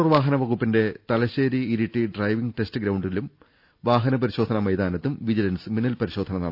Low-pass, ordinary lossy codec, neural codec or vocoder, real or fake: 5.4 kHz; none; none; real